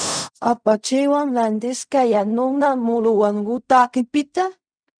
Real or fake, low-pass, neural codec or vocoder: fake; 9.9 kHz; codec, 16 kHz in and 24 kHz out, 0.4 kbps, LongCat-Audio-Codec, fine tuned four codebook decoder